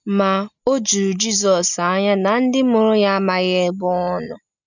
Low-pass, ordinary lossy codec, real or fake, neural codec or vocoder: 7.2 kHz; none; real; none